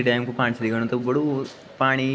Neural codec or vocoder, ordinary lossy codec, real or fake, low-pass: none; none; real; none